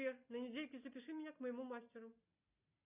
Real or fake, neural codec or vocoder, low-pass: real; none; 3.6 kHz